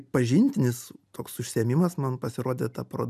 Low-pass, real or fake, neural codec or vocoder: 14.4 kHz; real; none